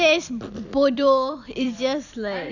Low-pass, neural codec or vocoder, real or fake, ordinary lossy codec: 7.2 kHz; vocoder, 44.1 kHz, 128 mel bands every 256 samples, BigVGAN v2; fake; none